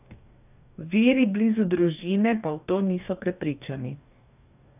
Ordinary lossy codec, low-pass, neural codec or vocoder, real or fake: none; 3.6 kHz; codec, 44.1 kHz, 2.6 kbps, DAC; fake